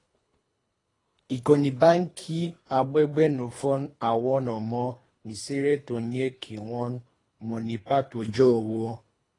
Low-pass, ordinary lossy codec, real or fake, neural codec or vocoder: 10.8 kHz; AAC, 32 kbps; fake; codec, 24 kHz, 3 kbps, HILCodec